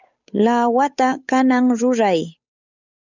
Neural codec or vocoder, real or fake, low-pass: codec, 16 kHz, 8 kbps, FunCodec, trained on Chinese and English, 25 frames a second; fake; 7.2 kHz